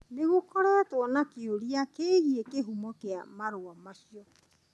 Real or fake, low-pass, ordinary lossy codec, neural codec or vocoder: real; none; none; none